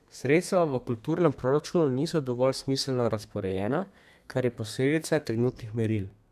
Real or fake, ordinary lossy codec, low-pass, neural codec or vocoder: fake; none; 14.4 kHz; codec, 32 kHz, 1.9 kbps, SNAC